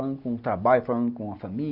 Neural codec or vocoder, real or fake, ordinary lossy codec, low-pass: none; real; none; 5.4 kHz